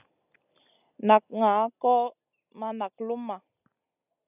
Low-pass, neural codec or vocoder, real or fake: 3.6 kHz; none; real